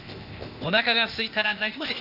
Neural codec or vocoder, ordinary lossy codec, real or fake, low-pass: codec, 16 kHz, 0.8 kbps, ZipCodec; none; fake; 5.4 kHz